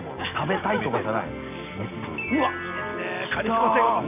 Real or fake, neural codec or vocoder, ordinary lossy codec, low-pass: real; none; none; 3.6 kHz